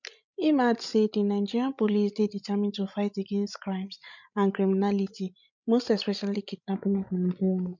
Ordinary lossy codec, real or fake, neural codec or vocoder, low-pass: none; fake; codec, 16 kHz, 16 kbps, FreqCodec, larger model; 7.2 kHz